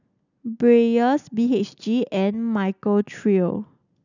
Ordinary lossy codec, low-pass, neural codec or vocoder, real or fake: none; 7.2 kHz; none; real